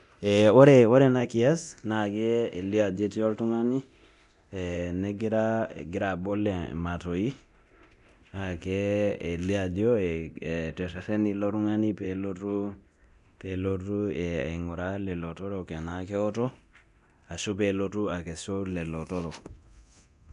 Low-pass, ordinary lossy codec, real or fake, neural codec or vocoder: 10.8 kHz; none; fake; codec, 24 kHz, 0.9 kbps, DualCodec